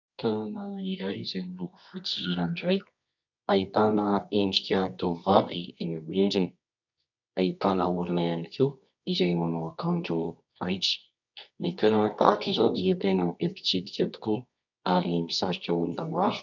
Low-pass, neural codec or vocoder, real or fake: 7.2 kHz; codec, 24 kHz, 0.9 kbps, WavTokenizer, medium music audio release; fake